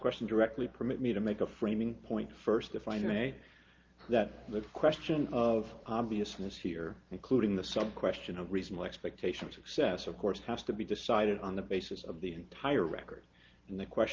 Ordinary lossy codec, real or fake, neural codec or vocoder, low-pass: Opus, 32 kbps; real; none; 7.2 kHz